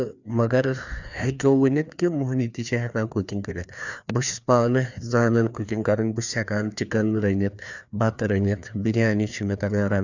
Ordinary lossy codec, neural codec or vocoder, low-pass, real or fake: none; codec, 16 kHz, 2 kbps, FreqCodec, larger model; 7.2 kHz; fake